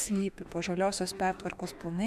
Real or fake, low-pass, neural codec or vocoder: fake; 14.4 kHz; autoencoder, 48 kHz, 32 numbers a frame, DAC-VAE, trained on Japanese speech